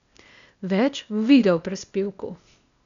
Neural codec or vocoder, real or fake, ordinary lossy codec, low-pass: codec, 16 kHz, 0.8 kbps, ZipCodec; fake; none; 7.2 kHz